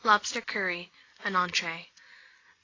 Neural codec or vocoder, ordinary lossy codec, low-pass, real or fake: none; AAC, 32 kbps; 7.2 kHz; real